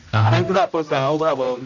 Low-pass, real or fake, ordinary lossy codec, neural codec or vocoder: 7.2 kHz; fake; none; codec, 16 kHz, 0.5 kbps, X-Codec, HuBERT features, trained on general audio